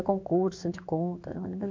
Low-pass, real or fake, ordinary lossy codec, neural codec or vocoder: 7.2 kHz; fake; MP3, 64 kbps; codec, 16 kHz in and 24 kHz out, 1 kbps, XY-Tokenizer